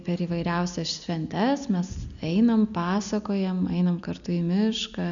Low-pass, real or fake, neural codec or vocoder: 7.2 kHz; real; none